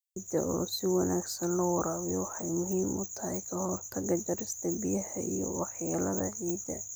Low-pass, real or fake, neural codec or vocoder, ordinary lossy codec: none; real; none; none